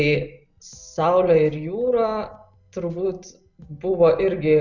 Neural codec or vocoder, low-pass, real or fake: none; 7.2 kHz; real